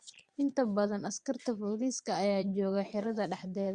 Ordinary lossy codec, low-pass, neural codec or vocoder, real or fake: Opus, 64 kbps; 9.9 kHz; vocoder, 22.05 kHz, 80 mel bands, Vocos; fake